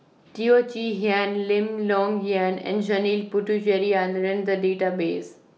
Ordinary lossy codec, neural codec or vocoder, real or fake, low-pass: none; none; real; none